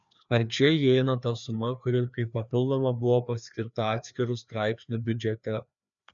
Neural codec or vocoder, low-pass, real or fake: codec, 16 kHz, 2 kbps, FreqCodec, larger model; 7.2 kHz; fake